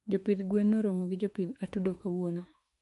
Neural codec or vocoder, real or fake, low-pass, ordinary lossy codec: autoencoder, 48 kHz, 32 numbers a frame, DAC-VAE, trained on Japanese speech; fake; 14.4 kHz; MP3, 48 kbps